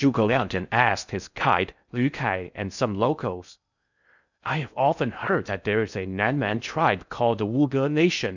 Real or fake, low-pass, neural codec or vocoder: fake; 7.2 kHz; codec, 16 kHz in and 24 kHz out, 0.6 kbps, FocalCodec, streaming, 4096 codes